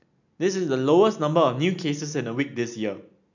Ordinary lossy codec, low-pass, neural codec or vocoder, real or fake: none; 7.2 kHz; none; real